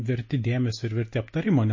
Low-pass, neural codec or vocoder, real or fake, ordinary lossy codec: 7.2 kHz; none; real; MP3, 32 kbps